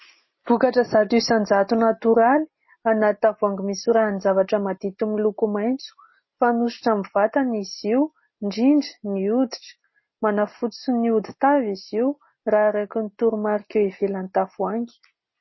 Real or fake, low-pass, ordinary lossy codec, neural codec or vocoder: real; 7.2 kHz; MP3, 24 kbps; none